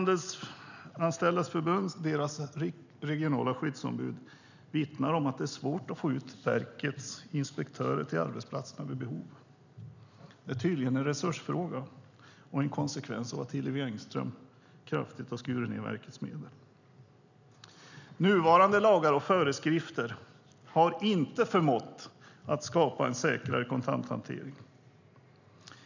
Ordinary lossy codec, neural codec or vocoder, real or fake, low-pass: none; none; real; 7.2 kHz